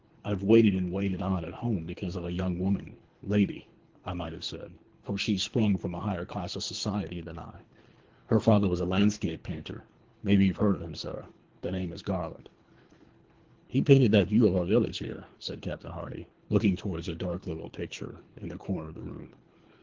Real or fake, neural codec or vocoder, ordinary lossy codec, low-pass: fake; codec, 24 kHz, 3 kbps, HILCodec; Opus, 16 kbps; 7.2 kHz